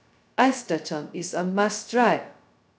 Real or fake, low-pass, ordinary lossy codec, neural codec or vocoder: fake; none; none; codec, 16 kHz, 0.2 kbps, FocalCodec